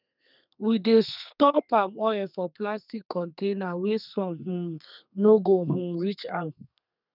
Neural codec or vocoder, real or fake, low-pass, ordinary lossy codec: codec, 32 kHz, 1.9 kbps, SNAC; fake; 5.4 kHz; none